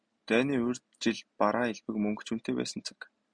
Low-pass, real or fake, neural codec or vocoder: 9.9 kHz; real; none